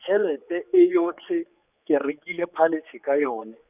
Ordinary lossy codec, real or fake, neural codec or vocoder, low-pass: none; fake; codec, 16 kHz, 4 kbps, X-Codec, HuBERT features, trained on general audio; 3.6 kHz